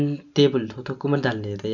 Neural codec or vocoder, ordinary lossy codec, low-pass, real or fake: none; none; 7.2 kHz; real